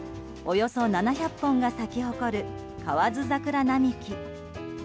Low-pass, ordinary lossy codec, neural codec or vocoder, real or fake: none; none; none; real